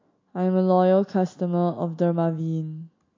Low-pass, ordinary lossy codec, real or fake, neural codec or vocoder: 7.2 kHz; MP3, 48 kbps; real; none